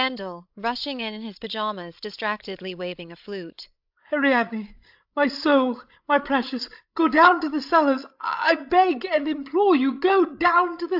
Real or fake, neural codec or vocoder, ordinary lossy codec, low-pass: fake; codec, 16 kHz, 16 kbps, FreqCodec, larger model; AAC, 48 kbps; 5.4 kHz